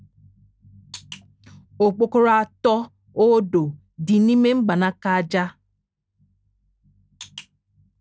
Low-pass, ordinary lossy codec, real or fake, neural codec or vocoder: none; none; real; none